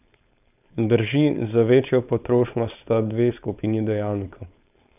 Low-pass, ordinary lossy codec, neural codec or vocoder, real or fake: 3.6 kHz; none; codec, 16 kHz, 4.8 kbps, FACodec; fake